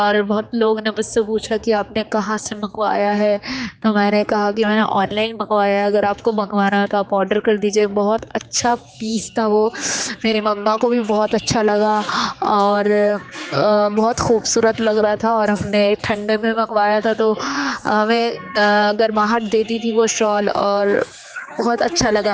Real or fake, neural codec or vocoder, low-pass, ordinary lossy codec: fake; codec, 16 kHz, 4 kbps, X-Codec, HuBERT features, trained on general audio; none; none